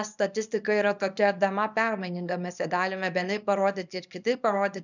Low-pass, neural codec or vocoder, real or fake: 7.2 kHz; codec, 24 kHz, 0.9 kbps, WavTokenizer, small release; fake